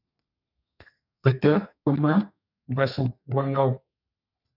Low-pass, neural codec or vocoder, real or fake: 5.4 kHz; codec, 32 kHz, 1.9 kbps, SNAC; fake